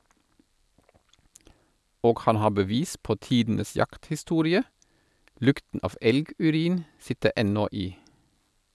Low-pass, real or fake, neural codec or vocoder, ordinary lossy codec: none; real; none; none